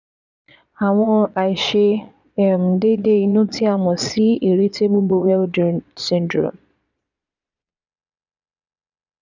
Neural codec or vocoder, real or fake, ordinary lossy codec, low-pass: vocoder, 22.05 kHz, 80 mel bands, Vocos; fake; none; 7.2 kHz